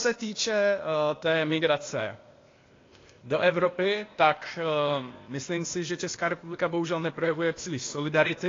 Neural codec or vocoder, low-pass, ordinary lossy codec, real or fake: codec, 16 kHz, 0.8 kbps, ZipCodec; 7.2 kHz; AAC, 32 kbps; fake